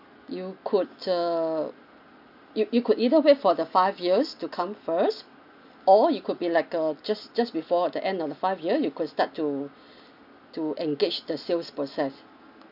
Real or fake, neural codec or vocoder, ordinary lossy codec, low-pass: real; none; none; 5.4 kHz